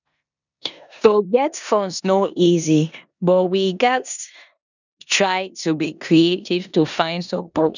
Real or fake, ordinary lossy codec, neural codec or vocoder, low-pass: fake; none; codec, 16 kHz in and 24 kHz out, 0.9 kbps, LongCat-Audio-Codec, four codebook decoder; 7.2 kHz